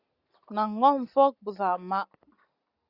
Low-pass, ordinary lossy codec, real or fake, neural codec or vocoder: 5.4 kHz; Opus, 64 kbps; real; none